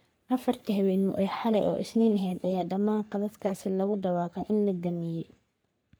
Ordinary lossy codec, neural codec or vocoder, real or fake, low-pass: none; codec, 44.1 kHz, 3.4 kbps, Pupu-Codec; fake; none